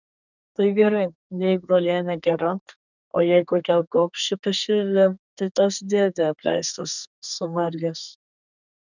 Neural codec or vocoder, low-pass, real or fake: codec, 32 kHz, 1.9 kbps, SNAC; 7.2 kHz; fake